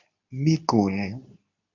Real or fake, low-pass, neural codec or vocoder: fake; 7.2 kHz; codec, 24 kHz, 0.9 kbps, WavTokenizer, medium speech release version 2